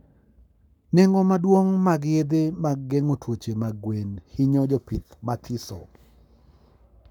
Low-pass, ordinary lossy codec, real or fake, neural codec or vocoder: 19.8 kHz; none; fake; codec, 44.1 kHz, 7.8 kbps, Pupu-Codec